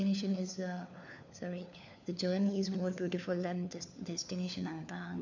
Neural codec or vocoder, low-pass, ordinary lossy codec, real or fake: codec, 16 kHz, 4 kbps, FunCodec, trained on LibriTTS, 50 frames a second; 7.2 kHz; none; fake